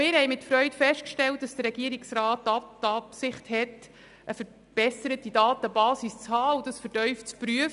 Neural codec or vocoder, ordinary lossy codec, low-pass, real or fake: none; none; 10.8 kHz; real